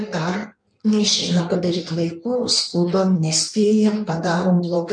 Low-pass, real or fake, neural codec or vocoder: 9.9 kHz; fake; codec, 16 kHz in and 24 kHz out, 1.1 kbps, FireRedTTS-2 codec